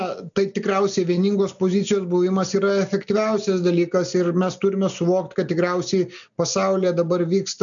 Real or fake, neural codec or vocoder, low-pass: real; none; 7.2 kHz